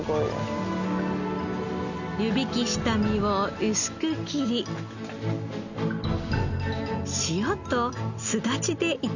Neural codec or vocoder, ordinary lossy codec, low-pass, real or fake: none; none; 7.2 kHz; real